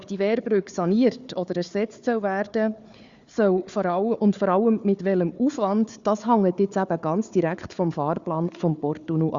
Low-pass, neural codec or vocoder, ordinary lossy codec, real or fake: 7.2 kHz; codec, 16 kHz, 4 kbps, FunCodec, trained on Chinese and English, 50 frames a second; Opus, 64 kbps; fake